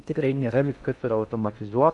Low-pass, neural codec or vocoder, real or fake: 10.8 kHz; codec, 16 kHz in and 24 kHz out, 0.6 kbps, FocalCodec, streaming, 2048 codes; fake